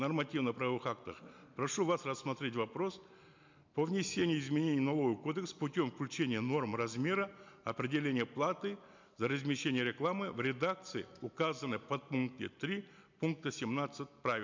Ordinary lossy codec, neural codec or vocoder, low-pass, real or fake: MP3, 64 kbps; none; 7.2 kHz; real